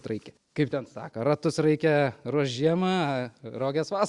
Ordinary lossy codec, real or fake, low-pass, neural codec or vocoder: Opus, 64 kbps; real; 10.8 kHz; none